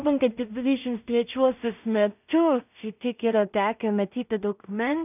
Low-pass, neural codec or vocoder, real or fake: 3.6 kHz; codec, 16 kHz in and 24 kHz out, 0.4 kbps, LongCat-Audio-Codec, two codebook decoder; fake